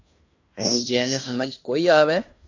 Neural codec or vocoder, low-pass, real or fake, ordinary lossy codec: codec, 16 kHz in and 24 kHz out, 0.9 kbps, LongCat-Audio-Codec, fine tuned four codebook decoder; 7.2 kHz; fake; AAC, 48 kbps